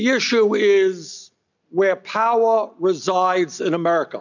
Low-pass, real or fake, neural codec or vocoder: 7.2 kHz; real; none